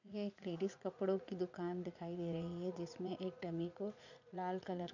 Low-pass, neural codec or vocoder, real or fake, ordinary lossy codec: 7.2 kHz; vocoder, 44.1 kHz, 80 mel bands, Vocos; fake; none